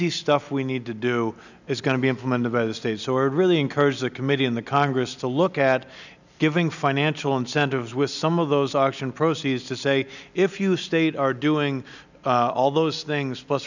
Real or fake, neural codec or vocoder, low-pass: real; none; 7.2 kHz